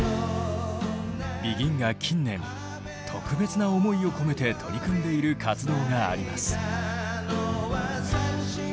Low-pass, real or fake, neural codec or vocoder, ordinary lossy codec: none; real; none; none